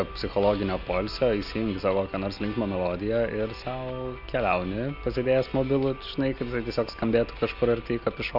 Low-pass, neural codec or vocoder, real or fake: 5.4 kHz; none; real